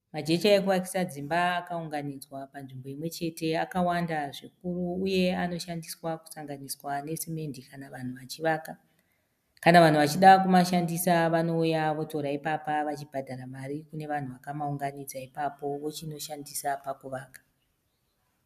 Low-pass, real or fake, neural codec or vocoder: 14.4 kHz; real; none